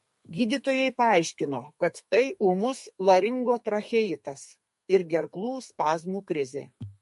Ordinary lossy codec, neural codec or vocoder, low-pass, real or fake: MP3, 48 kbps; codec, 32 kHz, 1.9 kbps, SNAC; 14.4 kHz; fake